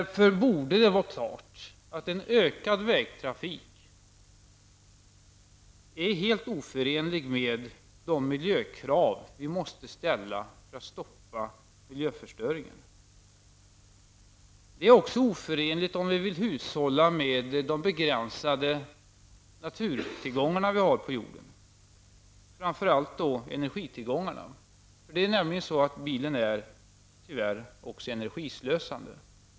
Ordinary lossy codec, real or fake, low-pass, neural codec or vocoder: none; real; none; none